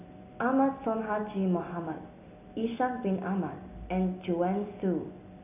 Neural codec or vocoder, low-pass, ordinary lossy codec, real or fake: none; 3.6 kHz; none; real